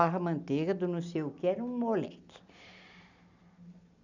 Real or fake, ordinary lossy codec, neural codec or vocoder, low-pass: real; none; none; 7.2 kHz